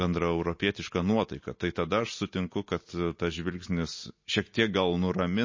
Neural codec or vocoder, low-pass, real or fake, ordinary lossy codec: none; 7.2 kHz; real; MP3, 32 kbps